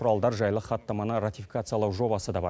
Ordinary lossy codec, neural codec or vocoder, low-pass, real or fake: none; none; none; real